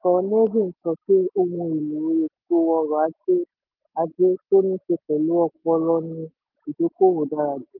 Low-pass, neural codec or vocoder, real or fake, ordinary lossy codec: 5.4 kHz; none; real; Opus, 24 kbps